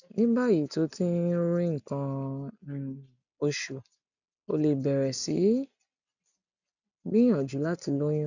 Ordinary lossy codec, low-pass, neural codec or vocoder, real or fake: none; 7.2 kHz; none; real